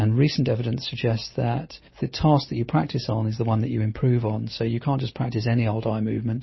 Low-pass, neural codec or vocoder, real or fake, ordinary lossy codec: 7.2 kHz; none; real; MP3, 24 kbps